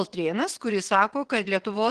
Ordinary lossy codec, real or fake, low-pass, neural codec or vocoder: Opus, 16 kbps; fake; 9.9 kHz; vocoder, 22.05 kHz, 80 mel bands, WaveNeXt